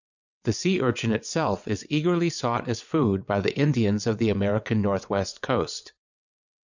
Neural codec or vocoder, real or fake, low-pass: vocoder, 22.05 kHz, 80 mel bands, WaveNeXt; fake; 7.2 kHz